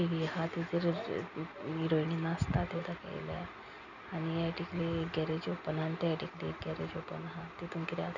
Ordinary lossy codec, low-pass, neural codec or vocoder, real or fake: none; 7.2 kHz; none; real